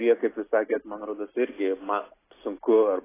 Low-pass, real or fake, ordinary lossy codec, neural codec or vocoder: 3.6 kHz; real; AAC, 16 kbps; none